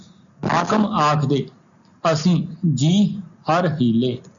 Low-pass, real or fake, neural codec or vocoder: 7.2 kHz; real; none